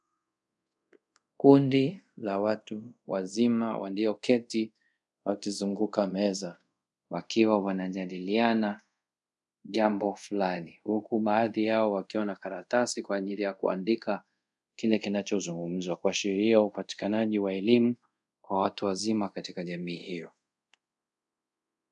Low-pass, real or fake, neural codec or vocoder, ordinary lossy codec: 10.8 kHz; fake; codec, 24 kHz, 0.5 kbps, DualCodec; MP3, 96 kbps